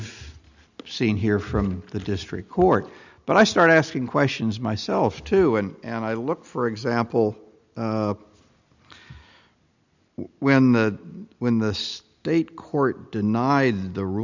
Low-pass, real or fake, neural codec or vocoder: 7.2 kHz; real; none